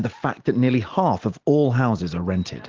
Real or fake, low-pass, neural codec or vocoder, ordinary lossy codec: real; 7.2 kHz; none; Opus, 16 kbps